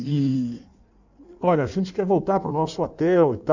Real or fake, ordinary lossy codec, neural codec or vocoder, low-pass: fake; none; codec, 16 kHz in and 24 kHz out, 1.1 kbps, FireRedTTS-2 codec; 7.2 kHz